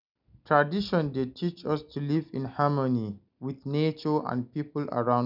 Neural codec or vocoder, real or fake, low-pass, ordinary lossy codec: none; real; 5.4 kHz; none